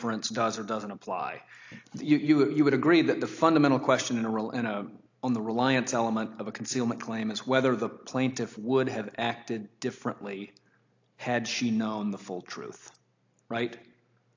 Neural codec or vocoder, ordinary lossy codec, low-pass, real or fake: none; AAC, 48 kbps; 7.2 kHz; real